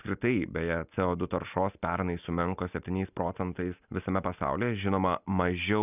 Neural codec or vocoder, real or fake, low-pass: none; real; 3.6 kHz